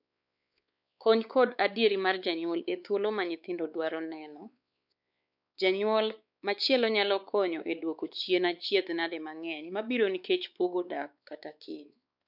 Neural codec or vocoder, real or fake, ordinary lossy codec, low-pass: codec, 16 kHz, 4 kbps, X-Codec, WavLM features, trained on Multilingual LibriSpeech; fake; none; 5.4 kHz